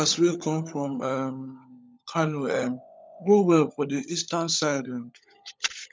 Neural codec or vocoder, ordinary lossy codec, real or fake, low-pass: codec, 16 kHz, 16 kbps, FunCodec, trained on LibriTTS, 50 frames a second; none; fake; none